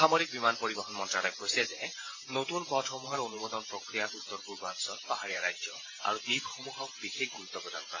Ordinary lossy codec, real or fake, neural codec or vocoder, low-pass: AAC, 32 kbps; fake; vocoder, 44.1 kHz, 128 mel bands every 512 samples, BigVGAN v2; 7.2 kHz